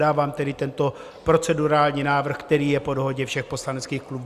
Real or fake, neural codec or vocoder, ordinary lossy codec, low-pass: real; none; Opus, 64 kbps; 14.4 kHz